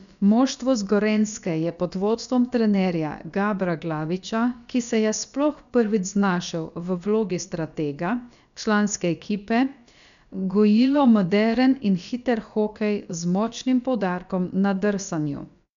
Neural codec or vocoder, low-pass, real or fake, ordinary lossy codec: codec, 16 kHz, about 1 kbps, DyCAST, with the encoder's durations; 7.2 kHz; fake; none